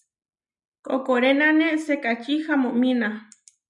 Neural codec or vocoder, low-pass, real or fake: none; 10.8 kHz; real